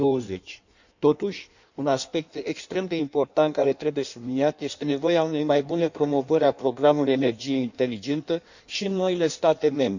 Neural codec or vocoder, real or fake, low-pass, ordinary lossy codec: codec, 16 kHz in and 24 kHz out, 1.1 kbps, FireRedTTS-2 codec; fake; 7.2 kHz; none